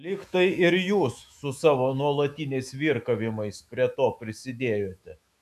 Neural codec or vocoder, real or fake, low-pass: autoencoder, 48 kHz, 128 numbers a frame, DAC-VAE, trained on Japanese speech; fake; 14.4 kHz